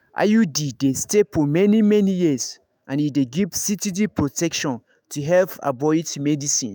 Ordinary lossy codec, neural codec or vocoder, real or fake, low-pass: none; autoencoder, 48 kHz, 128 numbers a frame, DAC-VAE, trained on Japanese speech; fake; none